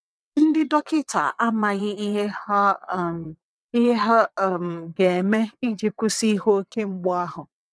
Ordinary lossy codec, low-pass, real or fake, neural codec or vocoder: none; none; fake; vocoder, 22.05 kHz, 80 mel bands, WaveNeXt